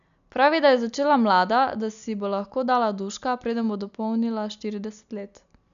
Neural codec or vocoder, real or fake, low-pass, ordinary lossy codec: none; real; 7.2 kHz; none